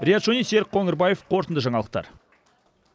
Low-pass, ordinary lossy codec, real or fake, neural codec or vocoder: none; none; real; none